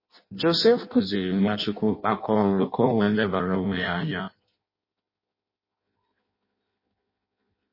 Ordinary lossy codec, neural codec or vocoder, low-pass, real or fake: MP3, 24 kbps; codec, 16 kHz in and 24 kHz out, 0.6 kbps, FireRedTTS-2 codec; 5.4 kHz; fake